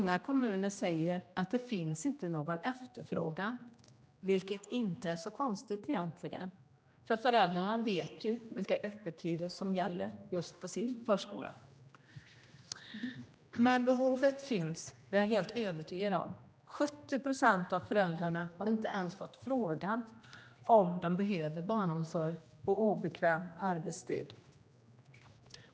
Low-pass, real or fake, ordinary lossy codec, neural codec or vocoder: none; fake; none; codec, 16 kHz, 1 kbps, X-Codec, HuBERT features, trained on general audio